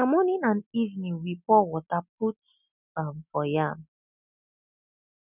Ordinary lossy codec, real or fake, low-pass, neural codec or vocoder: none; real; 3.6 kHz; none